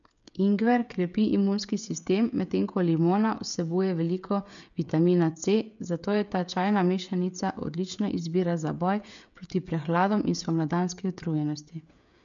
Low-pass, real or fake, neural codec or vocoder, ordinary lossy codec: 7.2 kHz; fake; codec, 16 kHz, 16 kbps, FreqCodec, smaller model; MP3, 96 kbps